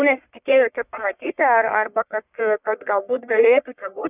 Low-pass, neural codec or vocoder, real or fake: 3.6 kHz; codec, 44.1 kHz, 1.7 kbps, Pupu-Codec; fake